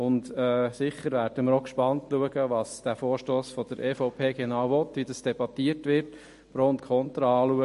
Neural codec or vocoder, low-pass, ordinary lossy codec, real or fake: none; 14.4 kHz; MP3, 48 kbps; real